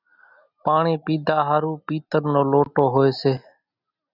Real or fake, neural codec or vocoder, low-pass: real; none; 5.4 kHz